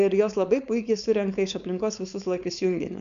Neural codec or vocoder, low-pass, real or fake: codec, 16 kHz, 4.8 kbps, FACodec; 7.2 kHz; fake